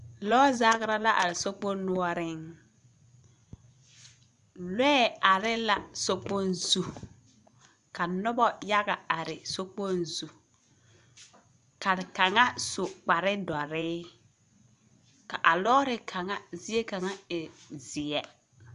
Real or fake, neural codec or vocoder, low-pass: fake; vocoder, 24 kHz, 100 mel bands, Vocos; 10.8 kHz